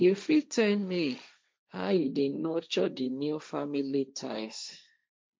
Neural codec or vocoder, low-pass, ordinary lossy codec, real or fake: codec, 16 kHz, 1.1 kbps, Voila-Tokenizer; none; none; fake